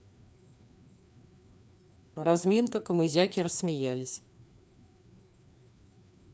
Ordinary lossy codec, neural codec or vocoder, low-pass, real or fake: none; codec, 16 kHz, 2 kbps, FreqCodec, larger model; none; fake